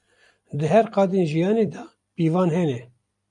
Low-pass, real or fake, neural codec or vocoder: 10.8 kHz; fake; vocoder, 44.1 kHz, 128 mel bands every 256 samples, BigVGAN v2